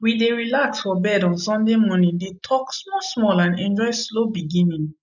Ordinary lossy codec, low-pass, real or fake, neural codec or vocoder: none; 7.2 kHz; real; none